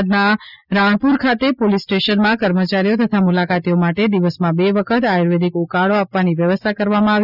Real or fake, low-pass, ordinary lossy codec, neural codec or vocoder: real; 5.4 kHz; none; none